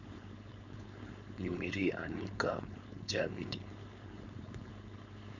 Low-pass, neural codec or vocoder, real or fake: 7.2 kHz; codec, 16 kHz, 4.8 kbps, FACodec; fake